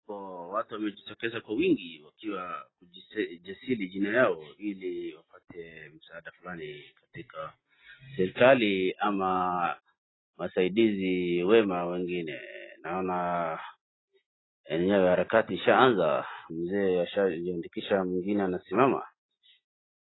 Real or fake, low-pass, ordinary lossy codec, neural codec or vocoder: real; 7.2 kHz; AAC, 16 kbps; none